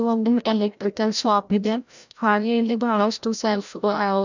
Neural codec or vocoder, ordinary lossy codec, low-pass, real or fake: codec, 16 kHz, 0.5 kbps, FreqCodec, larger model; none; 7.2 kHz; fake